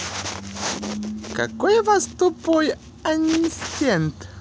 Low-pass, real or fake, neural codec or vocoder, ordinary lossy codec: none; real; none; none